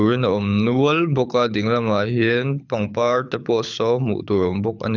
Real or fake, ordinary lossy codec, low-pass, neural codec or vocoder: fake; none; 7.2 kHz; codec, 24 kHz, 6 kbps, HILCodec